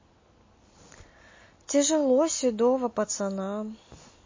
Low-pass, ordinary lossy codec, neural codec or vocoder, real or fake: 7.2 kHz; MP3, 32 kbps; none; real